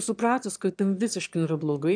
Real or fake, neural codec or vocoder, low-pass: fake; autoencoder, 22.05 kHz, a latent of 192 numbers a frame, VITS, trained on one speaker; 9.9 kHz